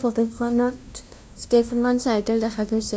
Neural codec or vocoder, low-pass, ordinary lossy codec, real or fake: codec, 16 kHz, 0.5 kbps, FunCodec, trained on LibriTTS, 25 frames a second; none; none; fake